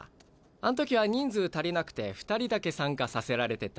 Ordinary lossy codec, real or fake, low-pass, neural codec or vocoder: none; real; none; none